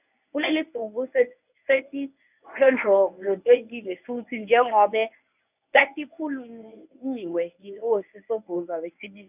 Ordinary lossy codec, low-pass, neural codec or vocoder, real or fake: none; 3.6 kHz; codec, 24 kHz, 0.9 kbps, WavTokenizer, medium speech release version 1; fake